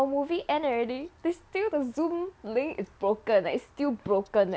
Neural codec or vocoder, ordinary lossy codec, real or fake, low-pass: none; none; real; none